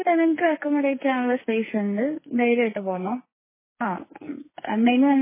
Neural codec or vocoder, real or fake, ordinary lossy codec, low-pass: codec, 44.1 kHz, 2.6 kbps, SNAC; fake; MP3, 16 kbps; 3.6 kHz